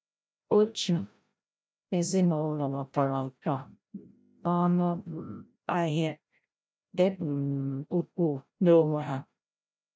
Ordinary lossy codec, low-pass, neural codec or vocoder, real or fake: none; none; codec, 16 kHz, 0.5 kbps, FreqCodec, larger model; fake